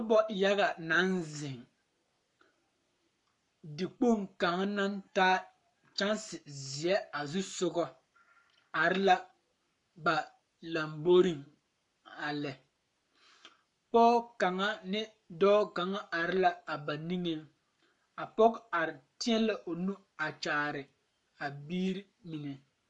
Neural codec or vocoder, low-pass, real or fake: codec, 44.1 kHz, 7.8 kbps, Pupu-Codec; 10.8 kHz; fake